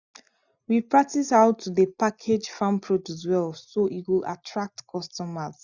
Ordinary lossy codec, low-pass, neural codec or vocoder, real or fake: none; 7.2 kHz; none; real